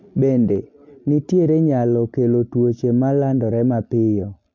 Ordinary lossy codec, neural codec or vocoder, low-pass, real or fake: MP3, 64 kbps; none; 7.2 kHz; real